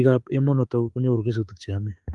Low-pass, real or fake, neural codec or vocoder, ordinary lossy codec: 10.8 kHz; fake; autoencoder, 48 kHz, 32 numbers a frame, DAC-VAE, trained on Japanese speech; Opus, 32 kbps